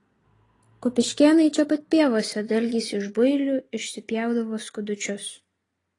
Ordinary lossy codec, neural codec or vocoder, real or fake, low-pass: AAC, 32 kbps; none; real; 10.8 kHz